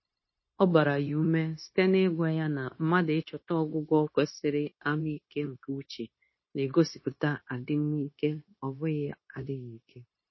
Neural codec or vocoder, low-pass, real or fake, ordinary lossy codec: codec, 16 kHz, 0.9 kbps, LongCat-Audio-Codec; 7.2 kHz; fake; MP3, 24 kbps